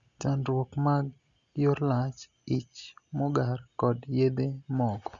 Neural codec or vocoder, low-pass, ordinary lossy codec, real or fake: none; 7.2 kHz; Opus, 64 kbps; real